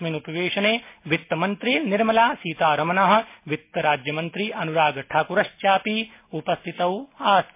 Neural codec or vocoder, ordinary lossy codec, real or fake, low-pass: none; MP3, 24 kbps; real; 3.6 kHz